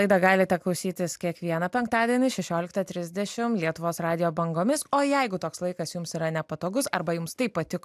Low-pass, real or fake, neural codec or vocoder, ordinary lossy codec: 14.4 kHz; real; none; AAC, 96 kbps